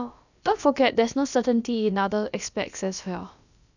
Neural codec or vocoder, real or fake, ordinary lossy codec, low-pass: codec, 16 kHz, about 1 kbps, DyCAST, with the encoder's durations; fake; none; 7.2 kHz